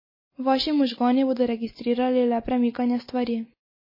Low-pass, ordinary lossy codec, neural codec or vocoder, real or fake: 5.4 kHz; MP3, 24 kbps; none; real